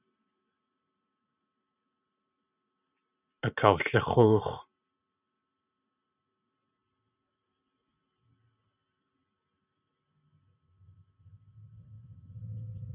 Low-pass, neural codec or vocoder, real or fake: 3.6 kHz; vocoder, 44.1 kHz, 128 mel bands every 512 samples, BigVGAN v2; fake